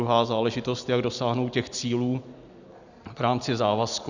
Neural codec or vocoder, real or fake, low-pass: none; real; 7.2 kHz